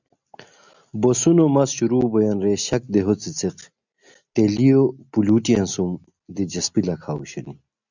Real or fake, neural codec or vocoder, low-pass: real; none; 7.2 kHz